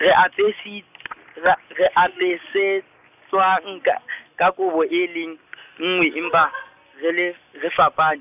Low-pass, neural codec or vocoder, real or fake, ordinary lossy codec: 3.6 kHz; none; real; none